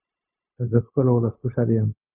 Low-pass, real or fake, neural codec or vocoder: 3.6 kHz; fake; codec, 16 kHz, 0.4 kbps, LongCat-Audio-Codec